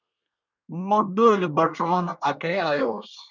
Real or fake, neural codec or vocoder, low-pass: fake; codec, 24 kHz, 1 kbps, SNAC; 7.2 kHz